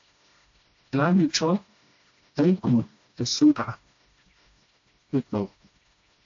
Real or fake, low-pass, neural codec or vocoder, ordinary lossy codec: fake; 7.2 kHz; codec, 16 kHz, 1 kbps, FreqCodec, smaller model; AAC, 48 kbps